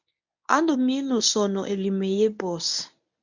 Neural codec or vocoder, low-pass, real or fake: codec, 24 kHz, 0.9 kbps, WavTokenizer, medium speech release version 1; 7.2 kHz; fake